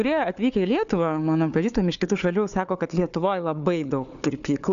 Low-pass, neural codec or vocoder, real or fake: 7.2 kHz; codec, 16 kHz, 4 kbps, FunCodec, trained on Chinese and English, 50 frames a second; fake